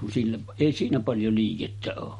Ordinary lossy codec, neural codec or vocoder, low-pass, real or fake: MP3, 48 kbps; none; 19.8 kHz; real